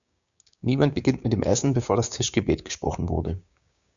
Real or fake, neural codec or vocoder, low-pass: fake; codec, 16 kHz, 6 kbps, DAC; 7.2 kHz